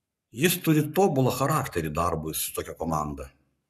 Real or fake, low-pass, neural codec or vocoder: fake; 14.4 kHz; codec, 44.1 kHz, 7.8 kbps, Pupu-Codec